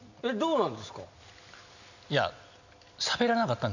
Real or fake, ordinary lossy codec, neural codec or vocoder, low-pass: real; none; none; 7.2 kHz